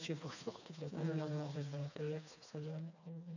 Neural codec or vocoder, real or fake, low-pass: codec, 16 kHz, 2 kbps, FreqCodec, smaller model; fake; 7.2 kHz